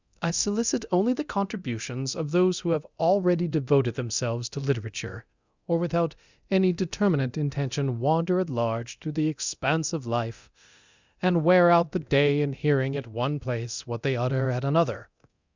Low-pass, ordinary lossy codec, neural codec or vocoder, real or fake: 7.2 kHz; Opus, 64 kbps; codec, 24 kHz, 0.9 kbps, DualCodec; fake